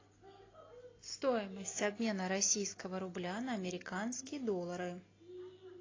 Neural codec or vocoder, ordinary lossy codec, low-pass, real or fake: none; AAC, 32 kbps; 7.2 kHz; real